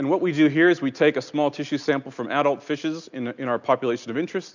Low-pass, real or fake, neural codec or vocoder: 7.2 kHz; real; none